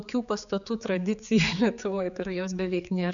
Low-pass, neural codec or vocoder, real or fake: 7.2 kHz; codec, 16 kHz, 4 kbps, X-Codec, HuBERT features, trained on general audio; fake